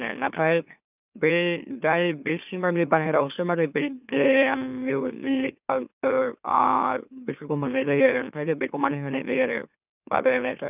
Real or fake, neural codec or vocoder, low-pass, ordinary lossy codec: fake; autoencoder, 44.1 kHz, a latent of 192 numbers a frame, MeloTTS; 3.6 kHz; none